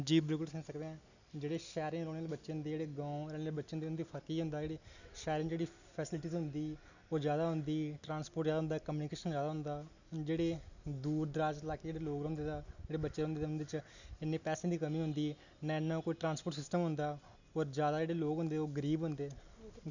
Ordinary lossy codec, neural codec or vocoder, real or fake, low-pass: none; none; real; 7.2 kHz